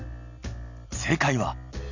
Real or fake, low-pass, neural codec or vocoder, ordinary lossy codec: real; 7.2 kHz; none; none